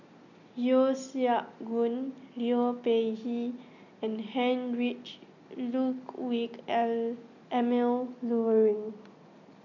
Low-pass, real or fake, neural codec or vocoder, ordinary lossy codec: 7.2 kHz; real; none; none